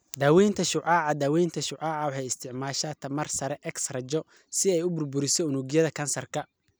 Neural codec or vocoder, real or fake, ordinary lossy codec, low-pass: none; real; none; none